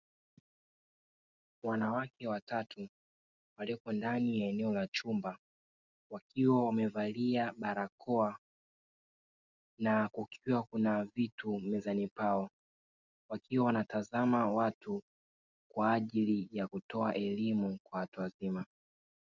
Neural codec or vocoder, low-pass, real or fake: none; 7.2 kHz; real